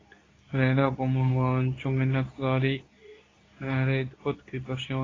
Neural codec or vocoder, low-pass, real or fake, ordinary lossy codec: codec, 24 kHz, 0.9 kbps, WavTokenizer, medium speech release version 2; 7.2 kHz; fake; AAC, 32 kbps